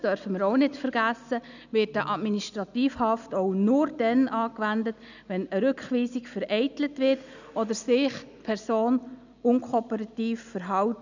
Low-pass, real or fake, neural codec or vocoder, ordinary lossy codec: 7.2 kHz; real; none; none